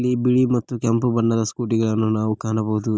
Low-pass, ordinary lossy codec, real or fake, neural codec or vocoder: none; none; real; none